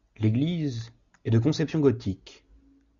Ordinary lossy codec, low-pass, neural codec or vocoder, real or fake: MP3, 96 kbps; 7.2 kHz; none; real